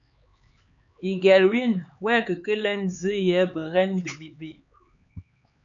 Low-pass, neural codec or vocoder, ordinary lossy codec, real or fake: 7.2 kHz; codec, 16 kHz, 4 kbps, X-Codec, HuBERT features, trained on LibriSpeech; MP3, 96 kbps; fake